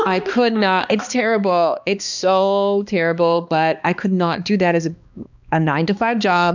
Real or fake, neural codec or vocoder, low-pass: fake; codec, 16 kHz, 2 kbps, X-Codec, HuBERT features, trained on balanced general audio; 7.2 kHz